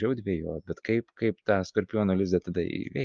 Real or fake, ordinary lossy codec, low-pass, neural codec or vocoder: real; Opus, 24 kbps; 7.2 kHz; none